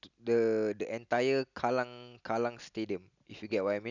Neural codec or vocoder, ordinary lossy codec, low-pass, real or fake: none; none; 7.2 kHz; real